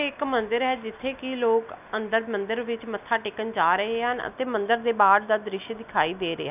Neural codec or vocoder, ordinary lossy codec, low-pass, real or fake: none; none; 3.6 kHz; real